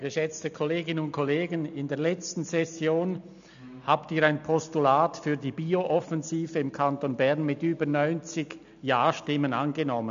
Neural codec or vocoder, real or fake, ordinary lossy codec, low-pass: none; real; AAC, 96 kbps; 7.2 kHz